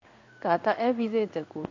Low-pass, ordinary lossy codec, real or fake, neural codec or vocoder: 7.2 kHz; AAC, 48 kbps; fake; codec, 16 kHz in and 24 kHz out, 1 kbps, XY-Tokenizer